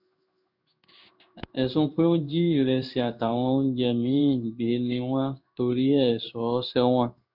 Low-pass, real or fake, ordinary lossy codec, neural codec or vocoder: 5.4 kHz; fake; none; codec, 16 kHz in and 24 kHz out, 1 kbps, XY-Tokenizer